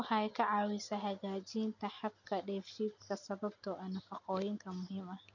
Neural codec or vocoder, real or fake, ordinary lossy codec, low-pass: none; real; none; 7.2 kHz